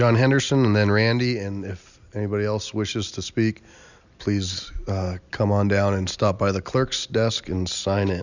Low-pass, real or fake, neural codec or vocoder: 7.2 kHz; real; none